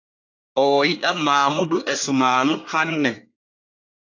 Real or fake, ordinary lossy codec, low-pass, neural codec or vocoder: fake; AAC, 48 kbps; 7.2 kHz; codec, 24 kHz, 1 kbps, SNAC